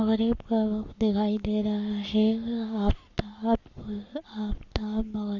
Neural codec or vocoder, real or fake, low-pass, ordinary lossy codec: codec, 44.1 kHz, 7.8 kbps, Pupu-Codec; fake; 7.2 kHz; Opus, 64 kbps